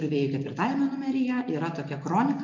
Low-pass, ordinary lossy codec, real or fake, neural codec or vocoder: 7.2 kHz; MP3, 64 kbps; real; none